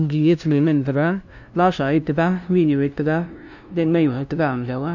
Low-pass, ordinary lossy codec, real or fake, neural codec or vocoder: 7.2 kHz; none; fake; codec, 16 kHz, 0.5 kbps, FunCodec, trained on LibriTTS, 25 frames a second